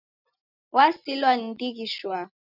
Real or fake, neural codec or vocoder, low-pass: real; none; 5.4 kHz